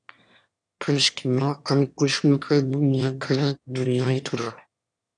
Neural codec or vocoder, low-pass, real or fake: autoencoder, 22.05 kHz, a latent of 192 numbers a frame, VITS, trained on one speaker; 9.9 kHz; fake